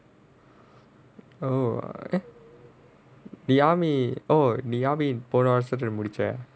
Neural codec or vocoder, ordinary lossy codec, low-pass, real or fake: none; none; none; real